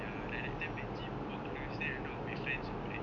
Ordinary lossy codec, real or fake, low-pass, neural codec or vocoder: none; real; 7.2 kHz; none